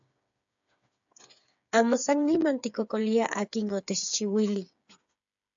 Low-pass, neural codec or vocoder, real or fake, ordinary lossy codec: 7.2 kHz; codec, 16 kHz, 8 kbps, FreqCodec, smaller model; fake; AAC, 64 kbps